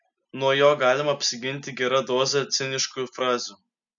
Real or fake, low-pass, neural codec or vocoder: real; 7.2 kHz; none